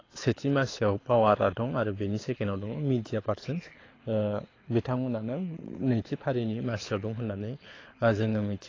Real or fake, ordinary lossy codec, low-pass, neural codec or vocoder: fake; AAC, 32 kbps; 7.2 kHz; codec, 24 kHz, 6 kbps, HILCodec